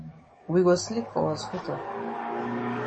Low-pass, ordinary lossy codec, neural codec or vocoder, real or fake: 10.8 kHz; MP3, 32 kbps; codec, 44.1 kHz, 7.8 kbps, DAC; fake